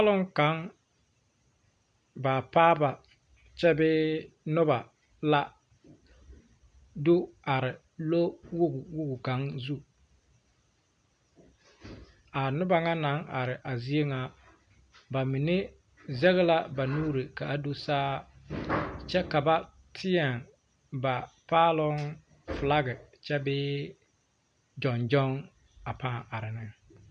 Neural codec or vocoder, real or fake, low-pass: none; real; 9.9 kHz